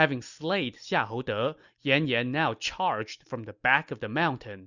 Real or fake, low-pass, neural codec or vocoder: real; 7.2 kHz; none